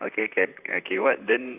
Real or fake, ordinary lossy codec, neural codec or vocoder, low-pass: fake; none; vocoder, 44.1 kHz, 128 mel bands, Pupu-Vocoder; 3.6 kHz